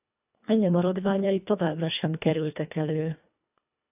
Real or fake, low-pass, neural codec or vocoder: fake; 3.6 kHz; codec, 24 kHz, 1.5 kbps, HILCodec